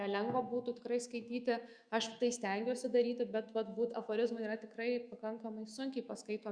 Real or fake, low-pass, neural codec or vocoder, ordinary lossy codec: fake; 9.9 kHz; autoencoder, 48 kHz, 128 numbers a frame, DAC-VAE, trained on Japanese speech; Opus, 64 kbps